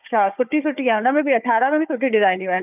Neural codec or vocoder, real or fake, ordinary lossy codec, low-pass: codec, 16 kHz, 4 kbps, FunCodec, trained on Chinese and English, 50 frames a second; fake; none; 3.6 kHz